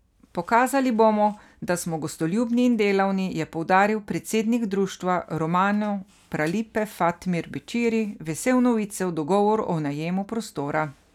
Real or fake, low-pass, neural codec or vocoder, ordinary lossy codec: real; 19.8 kHz; none; none